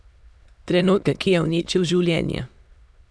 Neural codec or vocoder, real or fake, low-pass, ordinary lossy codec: autoencoder, 22.05 kHz, a latent of 192 numbers a frame, VITS, trained on many speakers; fake; none; none